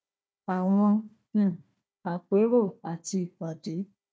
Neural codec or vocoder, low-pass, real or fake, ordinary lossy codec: codec, 16 kHz, 1 kbps, FunCodec, trained on Chinese and English, 50 frames a second; none; fake; none